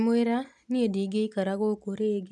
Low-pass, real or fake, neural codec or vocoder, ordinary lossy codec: none; real; none; none